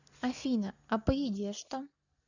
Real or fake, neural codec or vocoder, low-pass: real; none; 7.2 kHz